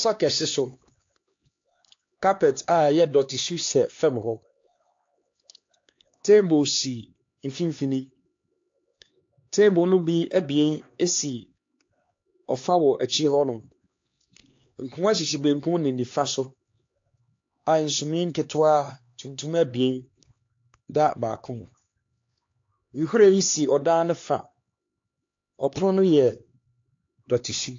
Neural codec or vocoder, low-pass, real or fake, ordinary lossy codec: codec, 16 kHz, 2 kbps, X-Codec, HuBERT features, trained on LibriSpeech; 7.2 kHz; fake; AAC, 48 kbps